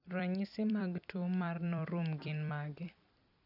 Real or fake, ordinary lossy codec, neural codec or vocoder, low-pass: fake; none; vocoder, 44.1 kHz, 128 mel bands every 256 samples, BigVGAN v2; 5.4 kHz